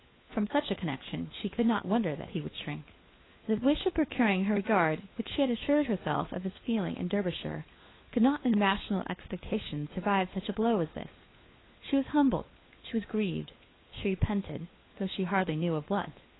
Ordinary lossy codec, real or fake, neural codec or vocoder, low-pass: AAC, 16 kbps; fake; codec, 16 kHz, 4 kbps, FunCodec, trained on LibriTTS, 50 frames a second; 7.2 kHz